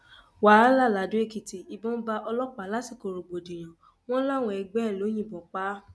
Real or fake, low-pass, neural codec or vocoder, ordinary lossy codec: real; none; none; none